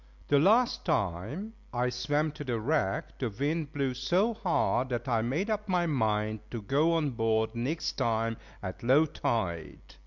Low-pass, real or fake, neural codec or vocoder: 7.2 kHz; real; none